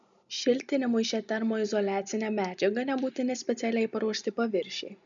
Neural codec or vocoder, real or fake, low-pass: none; real; 7.2 kHz